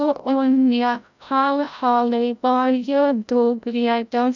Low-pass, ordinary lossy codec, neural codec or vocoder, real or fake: 7.2 kHz; none; codec, 16 kHz, 0.5 kbps, FreqCodec, larger model; fake